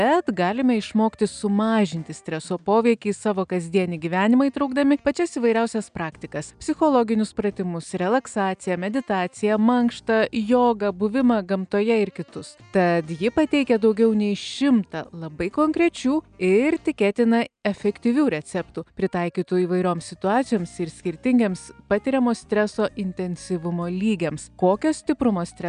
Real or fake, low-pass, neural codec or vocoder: real; 9.9 kHz; none